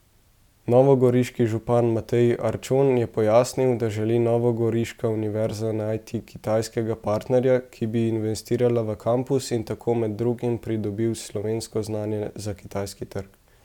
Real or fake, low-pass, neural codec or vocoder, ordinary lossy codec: real; 19.8 kHz; none; none